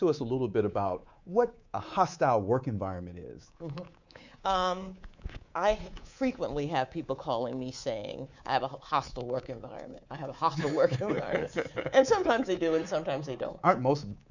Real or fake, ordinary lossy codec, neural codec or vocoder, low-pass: fake; Opus, 64 kbps; codec, 24 kHz, 3.1 kbps, DualCodec; 7.2 kHz